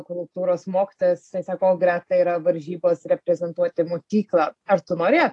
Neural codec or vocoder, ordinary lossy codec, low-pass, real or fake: none; AAC, 48 kbps; 10.8 kHz; real